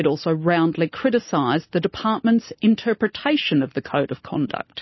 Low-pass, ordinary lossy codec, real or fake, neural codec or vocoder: 7.2 kHz; MP3, 24 kbps; real; none